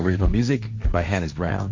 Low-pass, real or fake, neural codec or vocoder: 7.2 kHz; fake; codec, 16 kHz, 1.1 kbps, Voila-Tokenizer